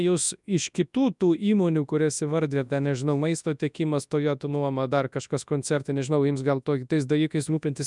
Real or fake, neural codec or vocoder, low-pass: fake; codec, 24 kHz, 0.9 kbps, WavTokenizer, large speech release; 10.8 kHz